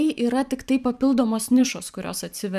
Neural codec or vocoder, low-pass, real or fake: vocoder, 44.1 kHz, 128 mel bands every 512 samples, BigVGAN v2; 14.4 kHz; fake